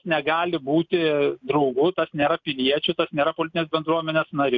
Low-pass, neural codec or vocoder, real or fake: 7.2 kHz; none; real